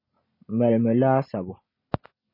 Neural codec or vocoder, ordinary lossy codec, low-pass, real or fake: none; MP3, 24 kbps; 5.4 kHz; real